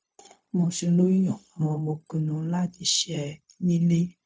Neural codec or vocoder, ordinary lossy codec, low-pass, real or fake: codec, 16 kHz, 0.4 kbps, LongCat-Audio-Codec; none; none; fake